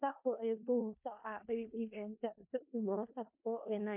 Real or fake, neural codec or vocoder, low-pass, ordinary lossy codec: fake; codec, 16 kHz in and 24 kHz out, 0.4 kbps, LongCat-Audio-Codec, four codebook decoder; 3.6 kHz; MP3, 32 kbps